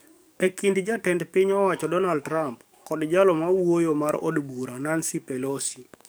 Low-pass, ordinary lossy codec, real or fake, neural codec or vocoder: none; none; fake; codec, 44.1 kHz, 7.8 kbps, DAC